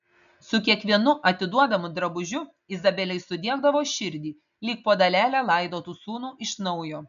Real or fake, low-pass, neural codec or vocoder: real; 7.2 kHz; none